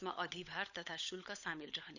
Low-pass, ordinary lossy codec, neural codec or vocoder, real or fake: 7.2 kHz; none; codec, 16 kHz, 16 kbps, FunCodec, trained on LibriTTS, 50 frames a second; fake